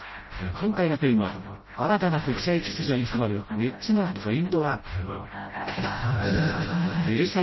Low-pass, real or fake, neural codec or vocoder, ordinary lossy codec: 7.2 kHz; fake; codec, 16 kHz, 0.5 kbps, FreqCodec, smaller model; MP3, 24 kbps